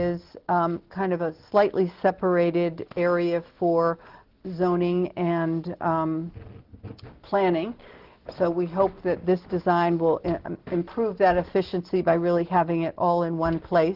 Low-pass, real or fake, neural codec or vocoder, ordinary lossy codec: 5.4 kHz; real; none; Opus, 16 kbps